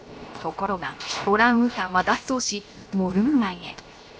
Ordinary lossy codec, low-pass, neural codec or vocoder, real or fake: none; none; codec, 16 kHz, 0.7 kbps, FocalCodec; fake